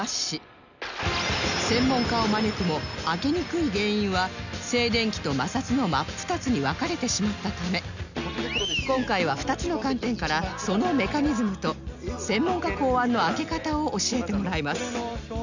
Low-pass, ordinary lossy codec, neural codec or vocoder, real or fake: 7.2 kHz; none; none; real